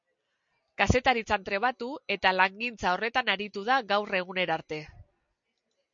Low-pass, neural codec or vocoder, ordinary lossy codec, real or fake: 7.2 kHz; none; MP3, 48 kbps; real